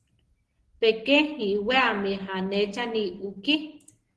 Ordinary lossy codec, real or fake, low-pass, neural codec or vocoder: Opus, 16 kbps; real; 10.8 kHz; none